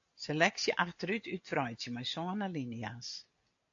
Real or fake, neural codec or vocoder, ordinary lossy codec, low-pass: real; none; AAC, 48 kbps; 7.2 kHz